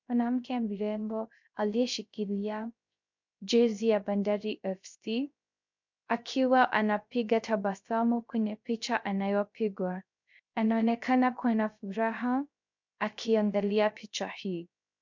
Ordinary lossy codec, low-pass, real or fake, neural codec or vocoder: MP3, 64 kbps; 7.2 kHz; fake; codec, 16 kHz, 0.3 kbps, FocalCodec